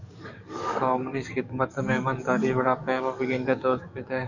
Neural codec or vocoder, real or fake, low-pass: codec, 44.1 kHz, 7.8 kbps, DAC; fake; 7.2 kHz